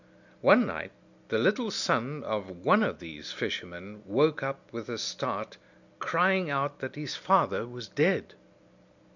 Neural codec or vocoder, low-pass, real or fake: none; 7.2 kHz; real